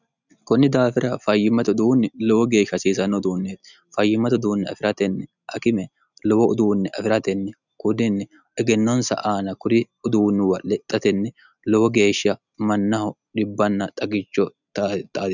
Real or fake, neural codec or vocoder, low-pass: real; none; 7.2 kHz